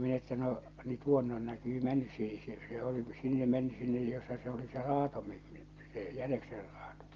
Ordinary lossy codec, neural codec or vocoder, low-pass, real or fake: Opus, 24 kbps; none; 7.2 kHz; real